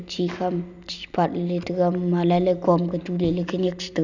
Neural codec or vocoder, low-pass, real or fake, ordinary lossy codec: none; 7.2 kHz; real; none